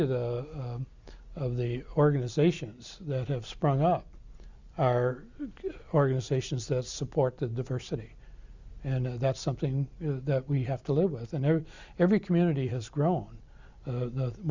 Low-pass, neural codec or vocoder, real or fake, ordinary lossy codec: 7.2 kHz; none; real; Opus, 64 kbps